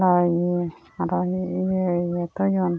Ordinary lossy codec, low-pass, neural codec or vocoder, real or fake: none; none; none; real